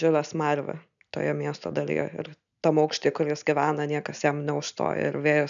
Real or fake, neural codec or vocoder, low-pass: real; none; 7.2 kHz